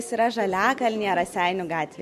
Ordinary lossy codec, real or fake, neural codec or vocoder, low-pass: MP3, 64 kbps; real; none; 14.4 kHz